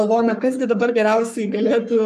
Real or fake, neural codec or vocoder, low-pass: fake; codec, 44.1 kHz, 3.4 kbps, Pupu-Codec; 14.4 kHz